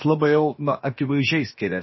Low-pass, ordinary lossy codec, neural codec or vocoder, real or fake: 7.2 kHz; MP3, 24 kbps; codec, 16 kHz, about 1 kbps, DyCAST, with the encoder's durations; fake